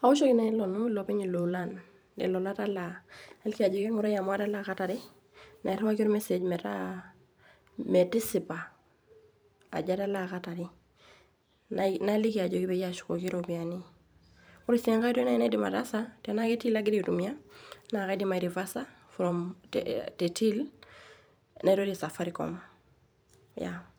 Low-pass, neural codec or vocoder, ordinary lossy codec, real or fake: none; vocoder, 44.1 kHz, 128 mel bands every 512 samples, BigVGAN v2; none; fake